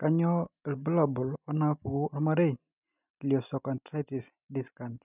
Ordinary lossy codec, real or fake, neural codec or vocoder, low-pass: none; real; none; 3.6 kHz